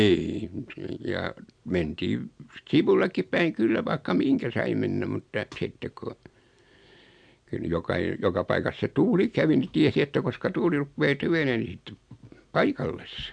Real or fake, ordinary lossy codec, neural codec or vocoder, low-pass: real; MP3, 64 kbps; none; 9.9 kHz